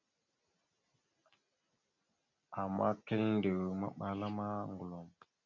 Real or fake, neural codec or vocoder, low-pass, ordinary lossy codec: real; none; 7.2 kHz; MP3, 64 kbps